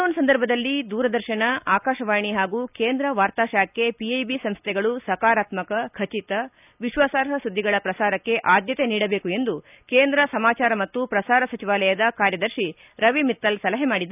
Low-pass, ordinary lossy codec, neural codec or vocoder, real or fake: 3.6 kHz; none; none; real